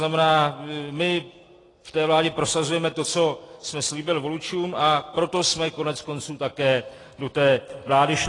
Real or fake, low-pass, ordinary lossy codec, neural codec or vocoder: real; 10.8 kHz; AAC, 32 kbps; none